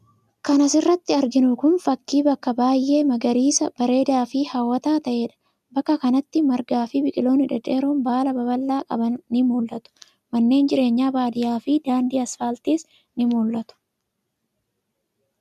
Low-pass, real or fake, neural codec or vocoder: 14.4 kHz; real; none